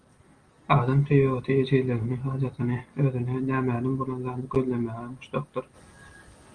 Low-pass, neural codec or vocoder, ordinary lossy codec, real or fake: 9.9 kHz; none; Opus, 24 kbps; real